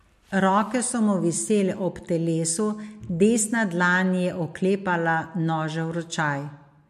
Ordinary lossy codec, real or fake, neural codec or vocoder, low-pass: MP3, 64 kbps; real; none; 14.4 kHz